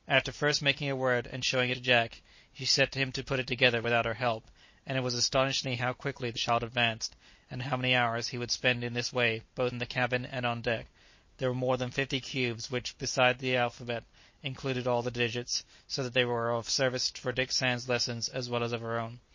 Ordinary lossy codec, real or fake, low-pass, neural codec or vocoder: MP3, 32 kbps; real; 7.2 kHz; none